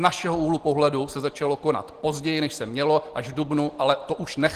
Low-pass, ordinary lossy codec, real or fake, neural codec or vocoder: 14.4 kHz; Opus, 16 kbps; real; none